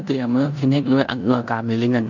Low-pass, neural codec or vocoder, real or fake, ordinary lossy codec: 7.2 kHz; codec, 16 kHz in and 24 kHz out, 0.9 kbps, LongCat-Audio-Codec, four codebook decoder; fake; none